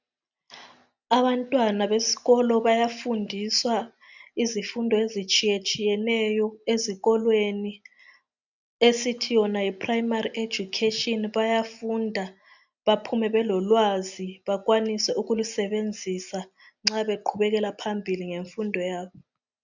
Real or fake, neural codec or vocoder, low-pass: real; none; 7.2 kHz